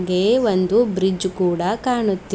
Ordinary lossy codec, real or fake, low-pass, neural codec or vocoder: none; real; none; none